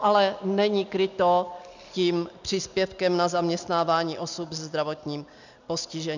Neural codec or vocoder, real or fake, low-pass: none; real; 7.2 kHz